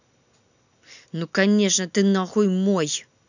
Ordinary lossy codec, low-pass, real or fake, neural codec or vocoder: none; 7.2 kHz; real; none